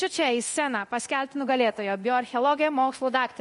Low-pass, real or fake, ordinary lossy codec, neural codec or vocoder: 10.8 kHz; fake; MP3, 48 kbps; codec, 24 kHz, 0.9 kbps, DualCodec